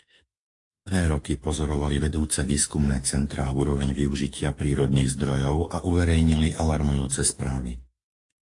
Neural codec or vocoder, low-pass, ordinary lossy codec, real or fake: autoencoder, 48 kHz, 32 numbers a frame, DAC-VAE, trained on Japanese speech; 10.8 kHz; AAC, 48 kbps; fake